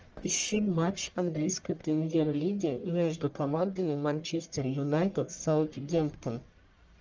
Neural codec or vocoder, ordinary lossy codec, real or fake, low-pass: codec, 44.1 kHz, 1.7 kbps, Pupu-Codec; Opus, 24 kbps; fake; 7.2 kHz